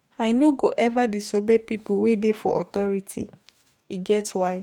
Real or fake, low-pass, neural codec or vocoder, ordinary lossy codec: fake; 19.8 kHz; codec, 44.1 kHz, 2.6 kbps, DAC; none